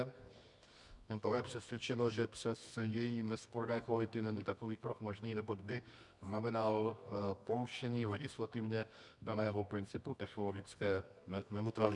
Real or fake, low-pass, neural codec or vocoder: fake; 10.8 kHz; codec, 24 kHz, 0.9 kbps, WavTokenizer, medium music audio release